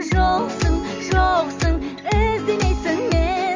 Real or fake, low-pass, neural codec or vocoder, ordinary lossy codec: real; 7.2 kHz; none; Opus, 32 kbps